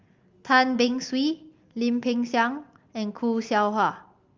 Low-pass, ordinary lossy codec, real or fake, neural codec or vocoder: 7.2 kHz; Opus, 32 kbps; real; none